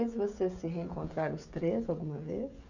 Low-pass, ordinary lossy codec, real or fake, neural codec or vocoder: 7.2 kHz; none; fake; codec, 16 kHz, 16 kbps, FreqCodec, smaller model